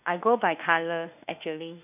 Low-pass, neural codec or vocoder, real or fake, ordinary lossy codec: 3.6 kHz; autoencoder, 48 kHz, 32 numbers a frame, DAC-VAE, trained on Japanese speech; fake; none